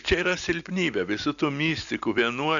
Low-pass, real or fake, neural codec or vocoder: 7.2 kHz; real; none